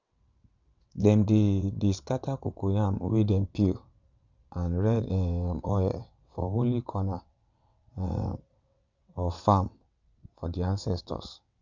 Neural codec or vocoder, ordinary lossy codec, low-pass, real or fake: vocoder, 22.05 kHz, 80 mel bands, WaveNeXt; Opus, 64 kbps; 7.2 kHz; fake